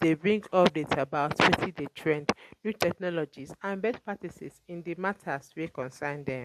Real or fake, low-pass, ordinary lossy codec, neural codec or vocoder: real; 14.4 kHz; MP3, 64 kbps; none